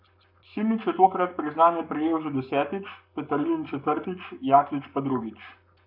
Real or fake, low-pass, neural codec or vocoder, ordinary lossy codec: fake; 5.4 kHz; codec, 44.1 kHz, 7.8 kbps, Pupu-Codec; none